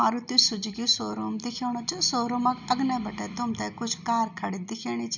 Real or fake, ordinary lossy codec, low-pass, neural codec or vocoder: real; none; 7.2 kHz; none